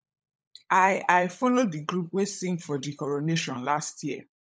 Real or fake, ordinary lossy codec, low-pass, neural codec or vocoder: fake; none; none; codec, 16 kHz, 16 kbps, FunCodec, trained on LibriTTS, 50 frames a second